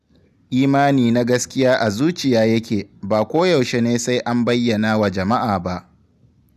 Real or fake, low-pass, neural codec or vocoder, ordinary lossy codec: real; 14.4 kHz; none; none